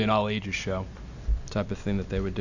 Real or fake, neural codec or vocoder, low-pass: real; none; 7.2 kHz